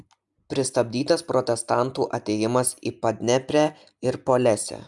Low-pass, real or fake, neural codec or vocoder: 10.8 kHz; real; none